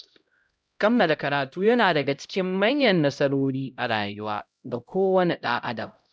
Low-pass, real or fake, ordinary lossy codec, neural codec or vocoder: none; fake; none; codec, 16 kHz, 0.5 kbps, X-Codec, HuBERT features, trained on LibriSpeech